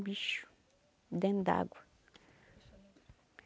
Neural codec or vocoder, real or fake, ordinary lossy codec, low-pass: none; real; none; none